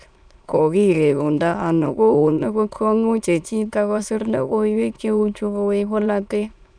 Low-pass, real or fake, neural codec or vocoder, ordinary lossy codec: 9.9 kHz; fake; autoencoder, 22.05 kHz, a latent of 192 numbers a frame, VITS, trained on many speakers; none